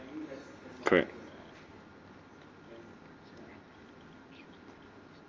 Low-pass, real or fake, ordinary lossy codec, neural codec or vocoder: 7.2 kHz; real; Opus, 32 kbps; none